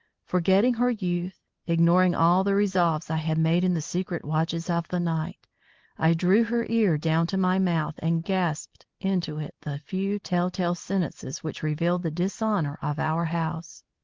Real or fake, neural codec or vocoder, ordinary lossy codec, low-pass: real; none; Opus, 16 kbps; 7.2 kHz